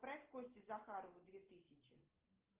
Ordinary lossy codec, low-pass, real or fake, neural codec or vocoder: Opus, 16 kbps; 3.6 kHz; real; none